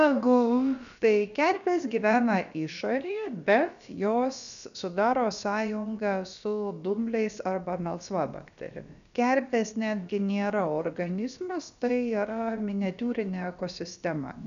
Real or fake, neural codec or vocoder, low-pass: fake; codec, 16 kHz, about 1 kbps, DyCAST, with the encoder's durations; 7.2 kHz